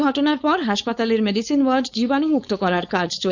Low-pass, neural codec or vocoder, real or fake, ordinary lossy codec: 7.2 kHz; codec, 16 kHz, 4.8 kbps, FACodec; fake; none